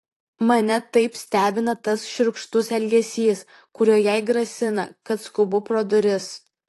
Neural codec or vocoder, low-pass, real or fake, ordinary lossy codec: vocoder, 44.1 kHz, 128 mel bands, Pupu-Vocoder; 14.4 kHz; fake; AAC, 48 kbps